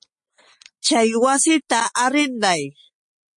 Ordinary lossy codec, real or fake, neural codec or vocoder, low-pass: MP3, 48 kbps; real; none; 10.8 kHz